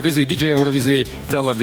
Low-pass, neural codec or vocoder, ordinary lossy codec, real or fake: 19.8 kHz; codec, 44.1 kHz, 2.6 kbps, DAC; Opus, 64 kbps; fake